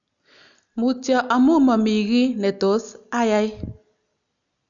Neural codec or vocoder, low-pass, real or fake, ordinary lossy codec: none; 7.2 kHz; real; none